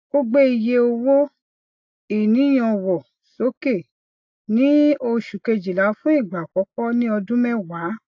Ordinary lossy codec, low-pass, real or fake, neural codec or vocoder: AAC, 48 kbps; 7.2 kHz; real; none